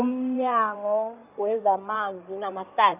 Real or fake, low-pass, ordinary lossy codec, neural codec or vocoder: fake; 3.6 kHz; none; codec, 16 kHz in and 24 kHz out, 2.2 kbps, FireRedTTS-2 codec